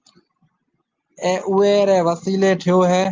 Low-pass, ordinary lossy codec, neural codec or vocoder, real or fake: 7.2 kHz; Opus, 32 kbps; none; real